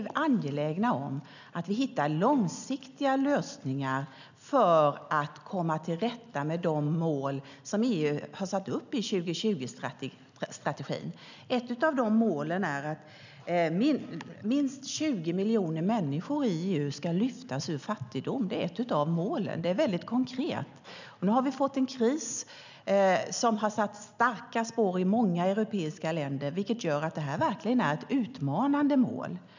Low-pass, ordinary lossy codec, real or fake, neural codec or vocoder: 7.2 kHz; none; real; none